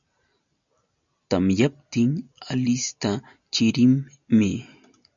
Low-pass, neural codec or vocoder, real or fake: 7.2 kHz; none; real